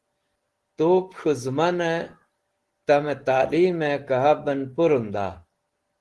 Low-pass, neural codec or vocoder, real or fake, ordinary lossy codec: 10.8 kHz; none; real; Opus, 16 kbps